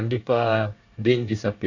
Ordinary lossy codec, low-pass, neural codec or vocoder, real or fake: none; 7.2 kHz; codec, 24 kHz, 1 kbps, SNAC; fake